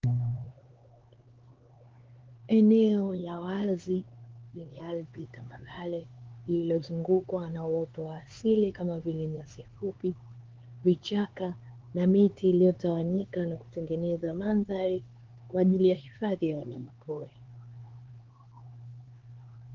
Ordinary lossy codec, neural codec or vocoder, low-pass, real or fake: Opus, 16 kbps; codec, 16 kHz, 4 kbps, X-Codec, HuBERT features, trained on LibriSpeech; 7.2 kHz; fake